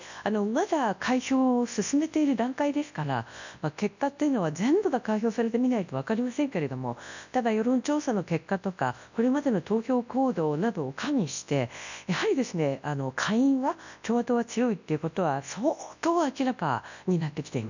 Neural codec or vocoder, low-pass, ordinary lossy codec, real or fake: codec, 24 kHz, 0.9 kbps, WavTokenizer, large speech release; 7.2 kHz; none; fake